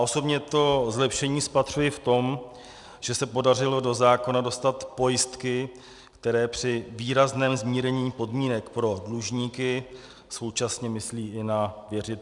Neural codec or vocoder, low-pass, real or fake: none; 10.8 kHz; real